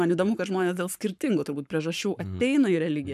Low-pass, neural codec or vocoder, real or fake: 14.4 kHz; codec, 44.1 kHz, 7.8 kbps, Pupu-Codec; fake